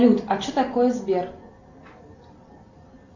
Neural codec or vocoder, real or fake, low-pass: none; real; 7.2 kHz